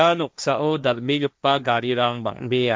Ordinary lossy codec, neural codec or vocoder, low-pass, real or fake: none; codec, 16 kHz, 1.1 kbps, Voila-Tokenizer; none; fake